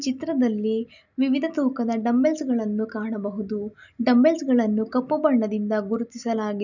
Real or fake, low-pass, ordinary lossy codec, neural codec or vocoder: real; 7.2 kHz; none; none